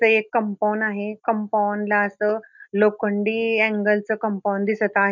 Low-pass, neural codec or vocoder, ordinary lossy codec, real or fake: 7.2 kHz; none; none; real